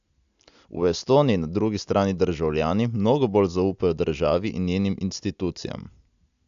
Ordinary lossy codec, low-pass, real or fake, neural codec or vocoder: AAC, 96 kbps; 7.2 kHz; real; none